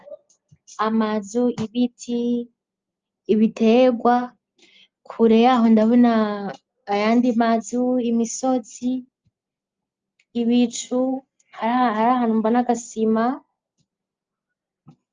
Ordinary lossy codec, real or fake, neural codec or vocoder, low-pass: Opus, 24 kbps; real; none; 7.2 kHz